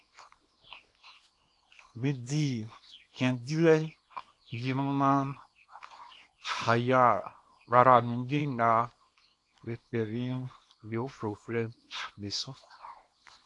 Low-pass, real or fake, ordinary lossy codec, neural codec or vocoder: 10.8 kHz; fake; AAC, 48 kbps; codec, 24 kHz, 0.9 kbps, WavTokenizer, small release